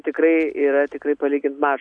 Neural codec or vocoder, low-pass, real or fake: none; 9.9 kHz; real